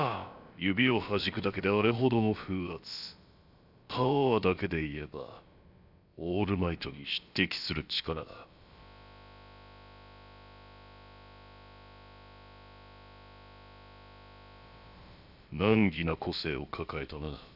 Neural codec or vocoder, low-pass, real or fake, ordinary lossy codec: codec, 16 kHz, about 1 kbps, DyCAST, with the encoder's durations; 5.4 kHz; fake; none